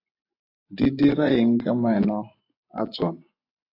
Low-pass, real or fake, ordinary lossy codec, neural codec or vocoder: 5.4 kHz; fake; AAC, 32 kbps; vocoder, 24 kHz, 100 mel bands, Vocos